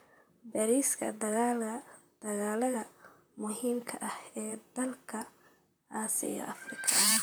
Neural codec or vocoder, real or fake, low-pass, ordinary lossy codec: vocoder, 44.1 kHz, 128 mel bands, Pupu-Vocoder; fake; none; none